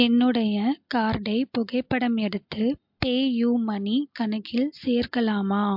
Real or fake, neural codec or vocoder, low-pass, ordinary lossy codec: real; none; 5.4 kHz; MP3, 48 kbps